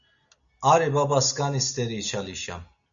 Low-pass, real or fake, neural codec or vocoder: 7.2 kHz; real; none